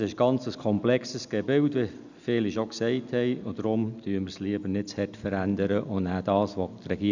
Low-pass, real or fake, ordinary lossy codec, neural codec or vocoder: 7.2 kHz; real; none; none